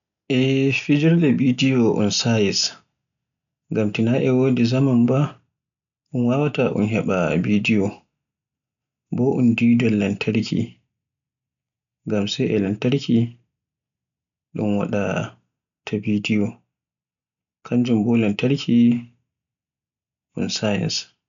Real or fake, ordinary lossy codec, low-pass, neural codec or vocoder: real; none; 7.2 kHz; none